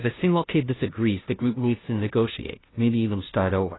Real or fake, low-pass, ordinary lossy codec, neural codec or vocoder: fake; 7.2 kHz; AAC, 16 kbps; codec, 16 kHz, 0.5 kbps, FunCodec, trained on Chinese and English, 25 frames a second